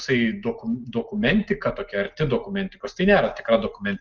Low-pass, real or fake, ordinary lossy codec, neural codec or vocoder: 7.2 kHz; real; Opus, 32 kbps; none